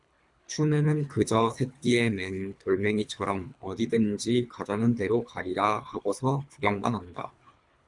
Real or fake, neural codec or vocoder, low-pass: fake; codec, 24 kHz, 3 kbps, HILCodec; 10.8 kHz